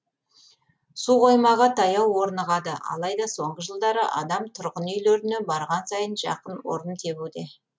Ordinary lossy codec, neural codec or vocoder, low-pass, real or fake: none; none; none; real